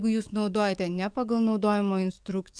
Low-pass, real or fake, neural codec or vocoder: 9.9 kHz; fake; codec, 44.1 kHz, 7.8 kbps, DAC